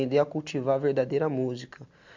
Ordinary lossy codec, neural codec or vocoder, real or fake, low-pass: MP3, 64 kbps; none; real; 7.2 kHz